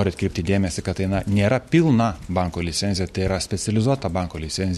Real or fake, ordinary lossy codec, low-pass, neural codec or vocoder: real; MP3, 64 kbps; 19.8 kHz; none